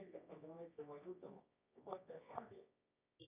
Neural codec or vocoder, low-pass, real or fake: codec, 24 kHz, 0.9 kbps, WavTokenizer, medium music audio release; 3.6 kHz; fake